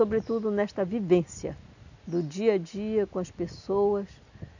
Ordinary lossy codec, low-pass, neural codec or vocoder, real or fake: none; 7.2 kHz; none; real